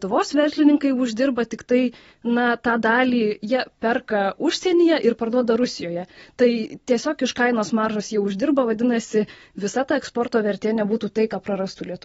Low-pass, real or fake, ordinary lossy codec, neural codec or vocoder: 19.8 kHz; real; AAC, 24 kbps; none